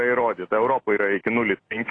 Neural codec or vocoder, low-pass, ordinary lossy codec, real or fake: none; 9.9 kHz; AAC, 32 kbps; real